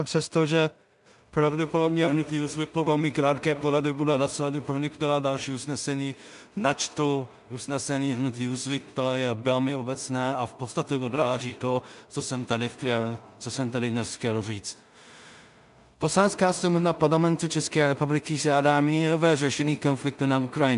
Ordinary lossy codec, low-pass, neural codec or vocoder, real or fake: AAC, 96 kbps; 10.8 kHz; codec, 16 kHz in and 24 kHz out, 0.4 kbps, LongCat-Audio-Codec, two codebook decoder; fake